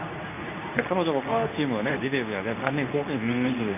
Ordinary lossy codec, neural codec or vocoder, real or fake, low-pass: none; codec, 24 kHz, 0.9 kbps, WavTokenizer, medium speech release version 2; fake; 3.6 kHz